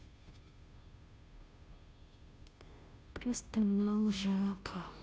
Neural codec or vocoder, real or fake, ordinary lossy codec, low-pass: codec, 16 kHz, 0.5 kbps, FunCodec, trained on Chinese and English, 25 frames a second; fake; none; none